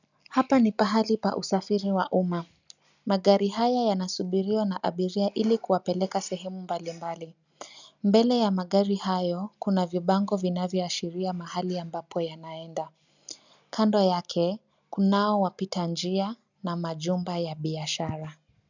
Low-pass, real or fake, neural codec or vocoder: 7.2 kHz; real; none